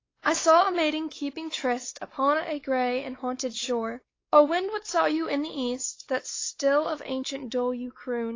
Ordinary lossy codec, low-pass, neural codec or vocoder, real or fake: AAC, 32 kbps; 7.2 kHz; codec, 16 kHz, 4 kbps, X-Codec, WavLM features, trained on Multilingual LibriSpeech; fake